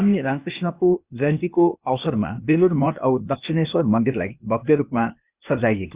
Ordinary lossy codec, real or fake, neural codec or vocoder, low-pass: Opus, 64 kbps; fake; codec, 16 kHz, 0.8 kbps, ZipCodec; 3.6 kHz